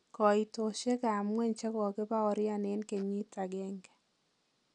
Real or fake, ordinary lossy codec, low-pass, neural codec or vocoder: real; none; 10.8 kHz; none